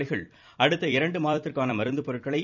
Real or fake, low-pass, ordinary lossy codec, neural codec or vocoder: fake; 7.2 kHz; none; vocoder, 44.1 kHz, 128 mel bands every 256 samples, BigVGAN v2